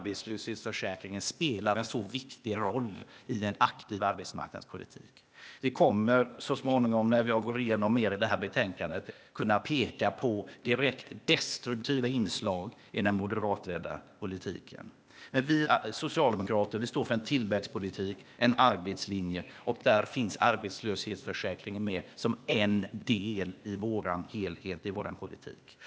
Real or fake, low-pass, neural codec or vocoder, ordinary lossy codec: fake; none; codec, 16 kHz, 0.8 kbps, ZipCodec; none